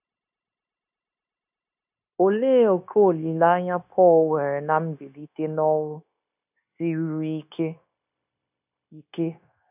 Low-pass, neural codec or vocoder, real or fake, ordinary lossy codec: 3.6 kHz; codec, 16 kHz, 0.9 kbps, LongCat-Audio-Codec; fake; none